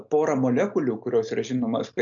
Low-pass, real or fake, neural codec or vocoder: 7.2 kHz; real; none